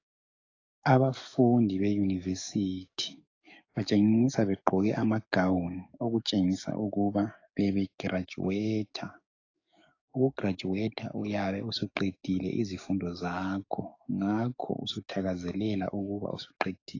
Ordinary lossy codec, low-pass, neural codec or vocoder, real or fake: AAC, 32 kbps; 7.2 kHz; codec, 44.1 kHz, 7.8 kbps, DAC; fake